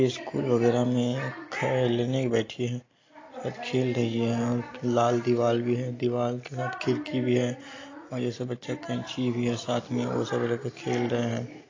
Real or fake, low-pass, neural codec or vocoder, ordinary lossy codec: real; 7.2 kHz; none; AAC, 32 kbps